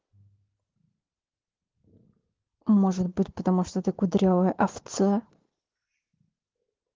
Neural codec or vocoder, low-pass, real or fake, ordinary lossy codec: none; 7.2 kHz; real; Opus, 16 kbps